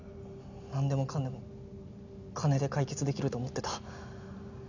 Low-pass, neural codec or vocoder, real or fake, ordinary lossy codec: 7.2 kHz; none; real; none